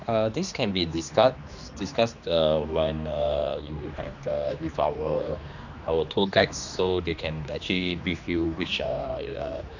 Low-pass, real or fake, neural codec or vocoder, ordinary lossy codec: 7.2 kHz; fake; codec, 16 kHz, 2 kbps, X-Codec, HuBERT features, trained on general audio; none